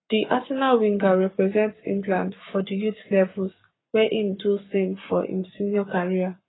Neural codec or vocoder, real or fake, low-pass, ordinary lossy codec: codec, 44.1 kHz, 7.8 kbps, Pupu-Codec; fake; 7.2 kHz; AAC, 16 kbps